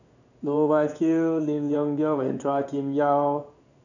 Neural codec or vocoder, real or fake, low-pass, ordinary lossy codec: codec, 16 kHz in and 24 kHz out, 1 kbps, XY-Tokenizer; fake; 7.2 kHz; none